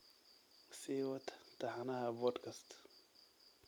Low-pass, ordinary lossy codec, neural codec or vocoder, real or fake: none; none; vocoder, 44.1 kHz, 128 mel bands every 256 samples, BigVGAN v2; fake